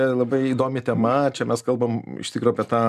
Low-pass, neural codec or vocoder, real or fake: 14.4 kHz; none; real